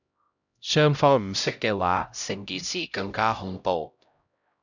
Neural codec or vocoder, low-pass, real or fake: codec, 16 kHz, 0.5 kbps, X-Codec, HuBERT features, trained on LibriSpeech; 7.2 kHz; fake